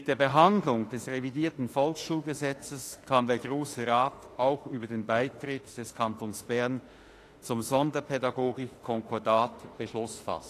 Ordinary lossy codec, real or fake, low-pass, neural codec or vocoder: AAC, 48 kbps; fake; 14.4 kHz; autoencoder, 48 kHz, 32 numbers a frame, DAC-VAE, trained on Japanese speech